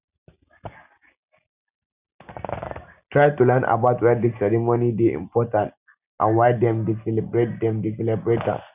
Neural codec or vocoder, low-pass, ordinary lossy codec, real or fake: none; 3.6 kHz; none; real